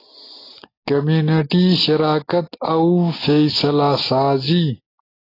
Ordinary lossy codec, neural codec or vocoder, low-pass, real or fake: AAC, 24 kbps; none; 5.4 kHz; real